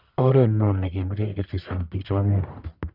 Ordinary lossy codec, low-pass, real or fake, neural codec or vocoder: none; 5.4 kHz; fake; codec, 44.1 kHz, 1.7 kbps, Pupu-Codec